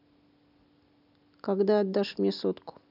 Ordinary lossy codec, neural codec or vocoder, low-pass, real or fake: none; none; 5.4 kHz; real